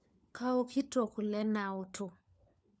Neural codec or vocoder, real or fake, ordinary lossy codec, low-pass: codec, 16 kHz, 4 kbps, FunCodec, trained on LibriTTS, 50 frames a second; fake; none; none